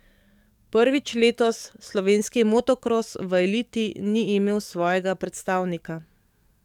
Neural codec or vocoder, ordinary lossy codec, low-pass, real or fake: codec, 44.1 kHz, 7.8 kbps, DAC; none; 19.8 kHz; fake